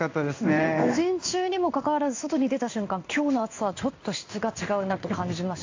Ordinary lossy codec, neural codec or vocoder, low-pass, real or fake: AAC, 48 kbps; codec, 16 kHz in and 24 kHz out, 1 kbps, XY-Tokenizer; 7.2 kHz; fake